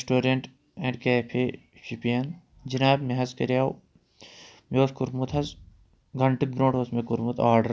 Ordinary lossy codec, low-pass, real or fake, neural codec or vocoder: none; none; real; none